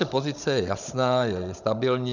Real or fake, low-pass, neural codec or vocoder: fake; 7.2 kHz; codec, 16 kHz, 16 kbps, FunCodec, trained on Chinese and English, 50 frames a second